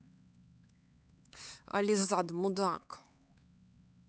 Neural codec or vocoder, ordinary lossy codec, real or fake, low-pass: codec, 16 kHz, 4 kbps, X-Codec, HuBERT features, trained on LibriSpeech; none; fake; none